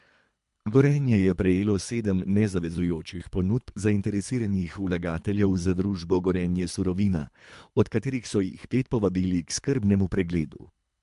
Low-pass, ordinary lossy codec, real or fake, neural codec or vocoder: 10.8 kHz; MP3, 64 kbps; fake; codec, 24 kHz, 3 kbps, HILCodec